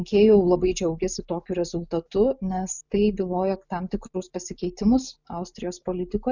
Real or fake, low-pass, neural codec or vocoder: real; 7.2 kHz; none